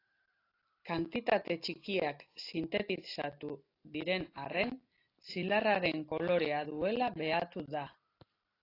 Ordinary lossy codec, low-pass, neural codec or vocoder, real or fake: AAC, 32 kbps; 5.4 kHz; none; real